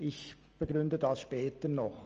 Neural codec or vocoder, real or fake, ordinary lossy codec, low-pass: none; real; Opus, 24 kbps; 7.2 kHz